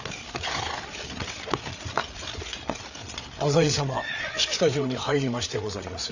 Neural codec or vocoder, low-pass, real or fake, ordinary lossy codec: codec, 16 kHz, 8 kbps, FreqCodec, larger model; 7.2 kHz; fake; none